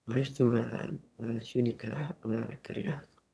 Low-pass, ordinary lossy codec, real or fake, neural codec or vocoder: none; none; fake; autoencoder, 22.05 kHz, a latent of 192 numbers a frame, VITS, trained on one speaker